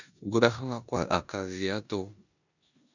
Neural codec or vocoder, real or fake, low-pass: codec, 16 kHz in and 24 kHz out, 0.9 kbps, LongCat-Audio-Codec, four codebook decoder; fake; 7.2 kHz